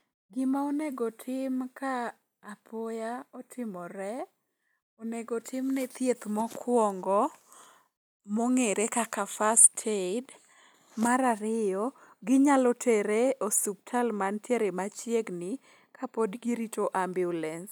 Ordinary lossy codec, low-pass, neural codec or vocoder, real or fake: none; none; none; real